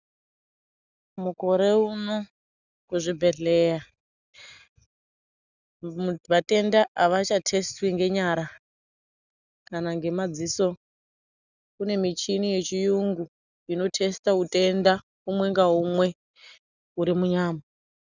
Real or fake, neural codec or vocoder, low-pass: real; none; 7.2 kHz